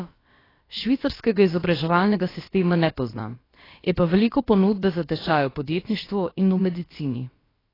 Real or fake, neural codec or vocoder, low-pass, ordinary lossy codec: fake; codec, 16 kHz, about 1 kbps, DyCAST, with the encoder's durations; 5.4 kHz; AAC, 24 kbps